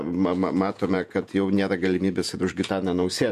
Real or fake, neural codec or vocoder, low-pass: fake; vocoder, 48 kHz, 128 mel bands, Vocos; 14.4 kHz